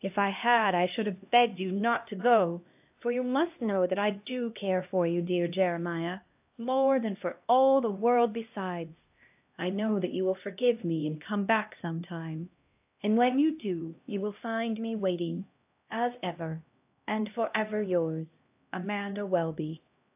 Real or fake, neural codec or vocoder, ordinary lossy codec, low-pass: fake; codec, 16 kHz, 1 kbps, X-Codec, HuBERT features, trained on LibriSpeech; AAC, 32 kbps; 3.6 kHz